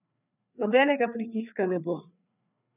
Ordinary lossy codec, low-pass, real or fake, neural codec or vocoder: none; 3.6 kHz; fake; codec, 16 kHz, 4 kbps, FreqCodec, larger model